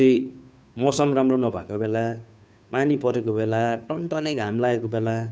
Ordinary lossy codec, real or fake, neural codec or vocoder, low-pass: none; fake; codec, 16 kHz, 2 kbps, FunCodec, trained on Chinese and English, 25 frames a second; none